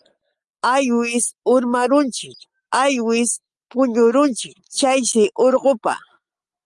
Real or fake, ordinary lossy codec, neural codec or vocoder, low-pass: real; Opus, 32 kbps; none; 10.8 kHz